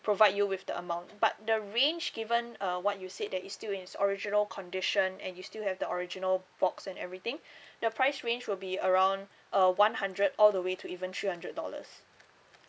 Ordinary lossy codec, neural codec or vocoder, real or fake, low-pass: none; none; real; none